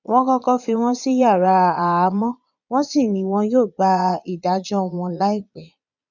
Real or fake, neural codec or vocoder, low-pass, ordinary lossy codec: fake; vocoder, 22.05 kHz, 80 mel bands, Vocos; 7.2 kHz; none